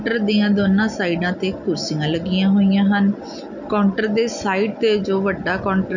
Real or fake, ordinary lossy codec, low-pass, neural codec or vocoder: real; none; 7.2 kHz; none